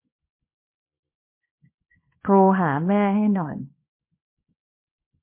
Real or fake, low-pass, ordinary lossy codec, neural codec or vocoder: fake; 3.6 kHz; MP3, 24 kbps; codec, 24 kHz, 0.9 kbps, WavTokenizer, small release